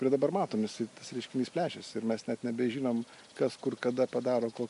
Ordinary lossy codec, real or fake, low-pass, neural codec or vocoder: MP3, 64 kbps; real; 10.8 kHz; none